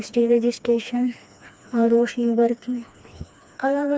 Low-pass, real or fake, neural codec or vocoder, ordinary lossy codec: none; fake; codec, 16 kHz, 2 kbps, FreqCodec, smaller model; none